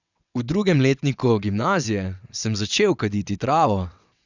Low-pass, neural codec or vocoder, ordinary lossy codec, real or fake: 7.2 kHz; vocoder, 22.05 kHz, 80 mel bands, WaveNeXt; none; fake